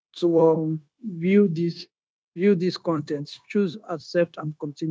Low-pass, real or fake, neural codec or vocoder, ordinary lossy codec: none; fake; codec, 16 kHz, 0.9 kbps, LongCat-Audio-Codec; none